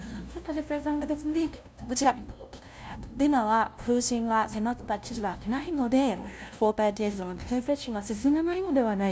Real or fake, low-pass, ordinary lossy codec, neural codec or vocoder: fake; none; none; codec, 16 kHz, 0.5 kbps, FunCodec, trained on LibriTTS, 25 frames a second